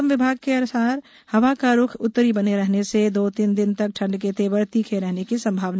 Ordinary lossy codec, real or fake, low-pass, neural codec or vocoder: none; real; none; none